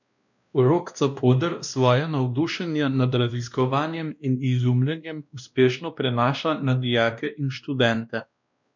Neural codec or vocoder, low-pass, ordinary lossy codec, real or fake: codec, 16 kHz, 1 kbps, X-Codec, WavLM features, trained on Multilingual LibriSpeech; 7.2 kHz; none; fake